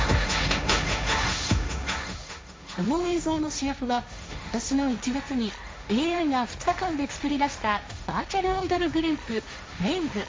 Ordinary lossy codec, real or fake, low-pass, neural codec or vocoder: none; fake; none; codec, 16 kHz, 1.1 kbps, Voila-Tokenizer